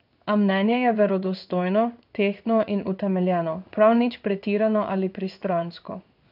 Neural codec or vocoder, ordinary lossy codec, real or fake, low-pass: codec, 16 kHz in and 24 kHz out, 1 kbps, XY-Tokenizer; none; fake; 5.4 kHz